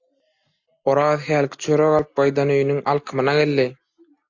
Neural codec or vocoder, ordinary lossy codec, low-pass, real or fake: none; AAC, 48 kbps; 7.2 kHz; real